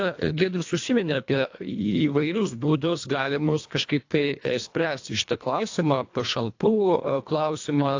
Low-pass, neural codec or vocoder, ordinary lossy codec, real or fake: 7.2 kHz; codec, 24 kHz, 1.5 kbps, HILCodec; AAC, 48 kbps; fake